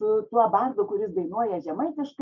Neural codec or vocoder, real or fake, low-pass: none; real; 7.2 kHz